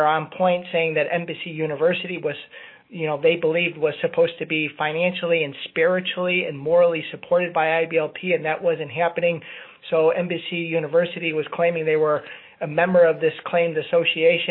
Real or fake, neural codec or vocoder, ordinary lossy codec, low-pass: fake; autoencoder, 48 kHz, 128 numbers a frame, DAC-VAE, trained on Japanese speech; MP3, 24 kbps; 5.4 kHz